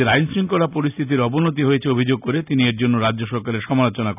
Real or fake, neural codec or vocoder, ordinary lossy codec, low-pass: real; none; none; 3.6 kHz